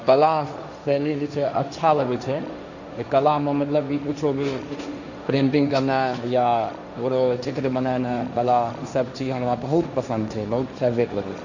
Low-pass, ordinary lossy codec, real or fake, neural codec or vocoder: 7.2 kHz; none; fake; codec, 16 kHz, 1.1 kbps, Voila-Tokenizer